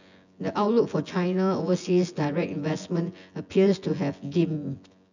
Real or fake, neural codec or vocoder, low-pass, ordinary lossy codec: fake; vocoder, 24 kHz, 100 mel bands, Vocos; 7.2 kHz; none